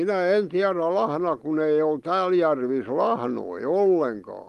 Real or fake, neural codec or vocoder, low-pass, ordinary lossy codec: real; none; 14.4 kHz; Opus, 24 kbps